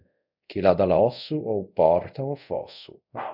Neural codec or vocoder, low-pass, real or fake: codec, 24 kHz, 0.5 kbps, DualCodec; 5.4 kHz; fake